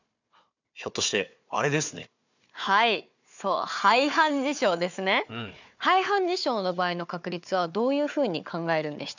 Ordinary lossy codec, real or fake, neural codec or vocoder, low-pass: none; fake; codec, 16 kHz, 4 kbps, FunCodec, trained on Chinese and English, 50 frames a second; 7.2 kHz